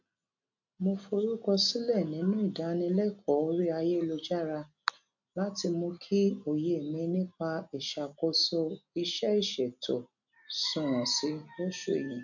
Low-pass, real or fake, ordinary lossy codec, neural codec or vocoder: 7.2 kHz; real; none; none